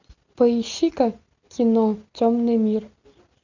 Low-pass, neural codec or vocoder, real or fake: 7.2 kHz; none; real